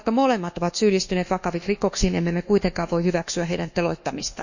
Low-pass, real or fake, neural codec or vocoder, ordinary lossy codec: 7.2 kHz; fake; codec, 24 kHz, 1.2 kbps, DualCodec; none